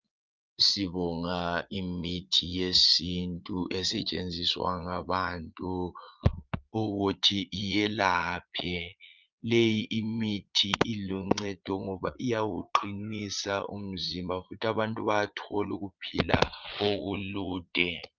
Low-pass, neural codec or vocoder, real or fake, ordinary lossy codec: 7.2 kHz; vocoder, 22.05 kHz, 80 mel bands, Vocos; fake; Opus, 24 kbps